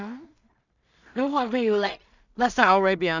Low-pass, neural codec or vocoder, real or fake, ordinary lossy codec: 7.2 kHz; codec, 16 kHz in and 24 kHz out, 0.4 kbps, LongCat-Audio-Codec, two codebook decoder; fake; none